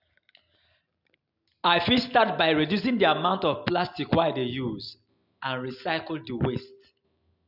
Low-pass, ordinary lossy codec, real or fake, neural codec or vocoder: 5.4 kHz; none; real; none